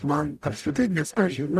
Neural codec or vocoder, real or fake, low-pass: codec, 44.1 kHz, 0.9 kbps, DAC; fake; 14.4 kHz